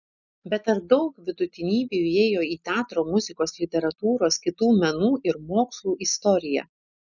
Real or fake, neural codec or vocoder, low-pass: real; none; 7.2 kHz